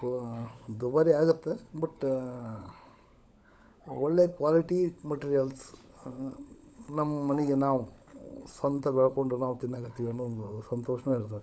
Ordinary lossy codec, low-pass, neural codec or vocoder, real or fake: none; none; codec, 16 kHz, 16 kbps, FunCodec, trained on LibriTTS, 50 frames a second; fake